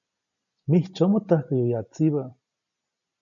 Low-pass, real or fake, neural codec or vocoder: 7.2 kHz; real; none